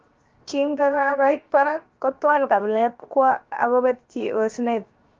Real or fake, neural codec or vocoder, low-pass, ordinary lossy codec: fake; codec, 16 kHz, 0.7 kbps, FocalCodec; 7.2 kHz; Opus, 32 kbps